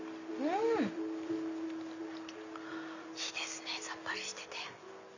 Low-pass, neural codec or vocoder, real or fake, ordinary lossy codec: 7.2 kHz; none; real; none